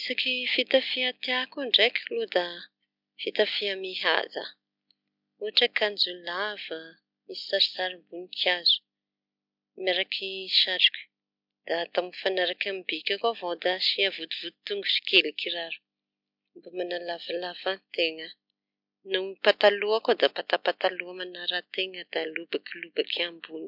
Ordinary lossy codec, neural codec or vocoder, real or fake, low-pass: MP3, 48 kbps; none; real; 5.4 kHz